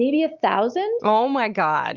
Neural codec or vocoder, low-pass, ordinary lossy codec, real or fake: none; 7.2 kHz; Opus, 24 kbps; real